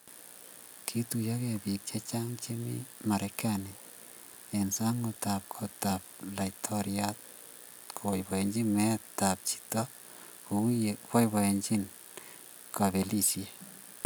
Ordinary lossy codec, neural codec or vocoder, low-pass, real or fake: none; none; none; real